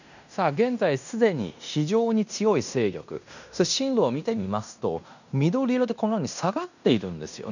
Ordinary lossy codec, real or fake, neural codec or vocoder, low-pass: none; fake; codec, 16 kHz in and 24 kHz out, 0.9 kbps, LongCat-Audio-Codec, fine tuned four codebook decoder; 7.2 kHz